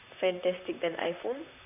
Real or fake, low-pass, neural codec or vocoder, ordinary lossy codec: fake; 3.6 kHz; vocoder, 44.1 kHz, 128 mel bands, Pupu-Vocoder; none